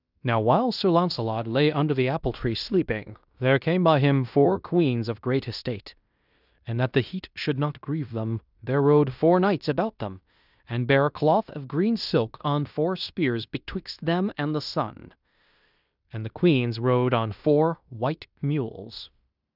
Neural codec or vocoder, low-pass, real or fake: codec, 16 kHz in and 24 kHz out, 0.9 kbps, LongCat-Audio-Codec, fine tuned four codebook decoder; 5.4 kHz; fake